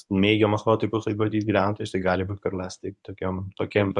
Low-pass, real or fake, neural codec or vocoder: 10.8 kHz; fake; codec, 24 kHz, 0.9 kbps, WavTokenizer, medium speech release version 1